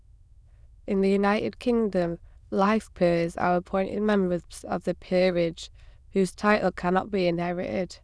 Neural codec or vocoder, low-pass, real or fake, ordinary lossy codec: autoencoder, 22.05 kHz, a latent of 192 numbers a frame, VITS, trained on many speakers; none; fake; none